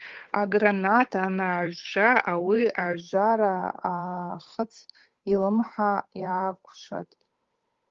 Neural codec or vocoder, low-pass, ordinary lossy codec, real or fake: codec, 16 kHz, 8 kbps, FunCodec, trained on Chinese and English, 25 frames a second; 7.2 kHz; Opus, 32 kbps; fake